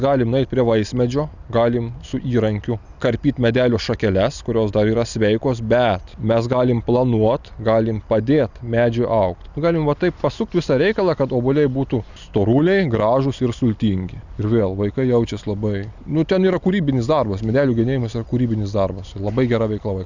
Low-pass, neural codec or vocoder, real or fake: 7.2 kHz; none; real